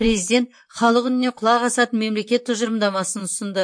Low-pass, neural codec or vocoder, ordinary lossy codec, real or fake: 9.9 kHz; vocoder, 44.1 kHz, 128 mel bands, Pupu-Vocoder; MP3, 48 kbps; fake